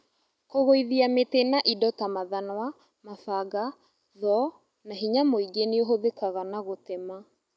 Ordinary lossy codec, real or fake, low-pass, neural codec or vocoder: none; real; none; none